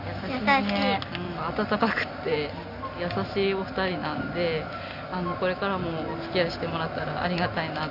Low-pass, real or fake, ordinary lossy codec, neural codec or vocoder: 5.4 kHz; real; none; none